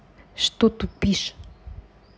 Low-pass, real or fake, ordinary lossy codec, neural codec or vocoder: none; real; none; none